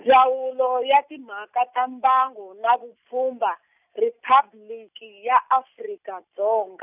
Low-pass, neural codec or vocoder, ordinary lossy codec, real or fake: 3.6 kHz; none; none; real